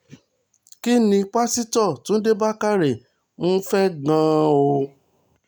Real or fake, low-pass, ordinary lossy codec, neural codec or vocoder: real; none; none; none